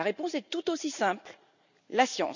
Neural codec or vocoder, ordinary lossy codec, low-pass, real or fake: none; none; 7.2 kHz; real